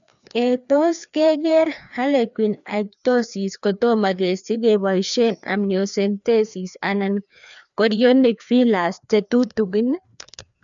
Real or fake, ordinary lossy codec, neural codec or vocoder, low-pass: fake; none; codec, 16 kHz, 2 kbps, FreqCodec, larger model; 7.2 kHz